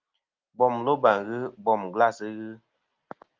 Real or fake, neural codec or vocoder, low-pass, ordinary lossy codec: real; none; 7.2 kHz; Opus, 32 kbps